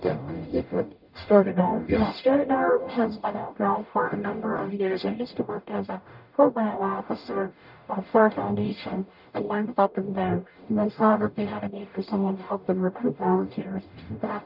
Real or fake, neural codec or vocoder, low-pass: fake; codec, 44.1 kHz, 0.9 kbps, DAC; 5.4 kHz